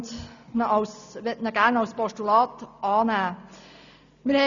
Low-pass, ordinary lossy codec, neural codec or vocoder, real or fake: 7.2 kHz; none; none; real